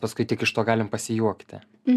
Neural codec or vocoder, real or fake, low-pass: none; real; 14.4 kHz